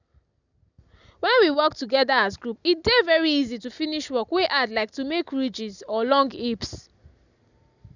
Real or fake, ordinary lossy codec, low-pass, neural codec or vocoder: real; none; 7.2 kHz; none